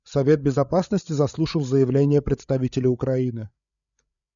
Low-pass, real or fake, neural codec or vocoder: 7.2 kHz; fake; codec, 16 kHz, 8 kbps, FreqCodec, larger model